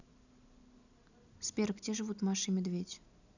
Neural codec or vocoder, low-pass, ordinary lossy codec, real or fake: none; 7.2 kHz; none; real